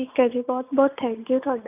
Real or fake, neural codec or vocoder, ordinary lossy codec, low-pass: real; none; none; 3.6 kHz